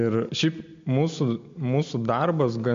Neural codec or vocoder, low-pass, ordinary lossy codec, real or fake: none; 7.2 kHz; MP3, 48 kbps; real